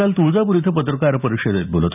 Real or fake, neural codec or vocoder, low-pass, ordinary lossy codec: real; none; 3.6 kHz; none